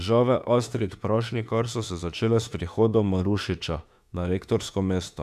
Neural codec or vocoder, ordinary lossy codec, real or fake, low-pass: autoencoder, 48 kHz, 32 numbers a frame, DAC-VAE, trained on Japanese speech; none; fake; 14.4 kHz